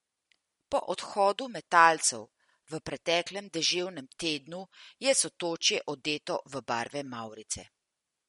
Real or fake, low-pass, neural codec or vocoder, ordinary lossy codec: real; 19.8 kHz; none; MP3, 48 kbps